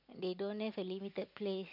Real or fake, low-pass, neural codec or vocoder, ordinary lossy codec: real; 5.4 kHz; none; none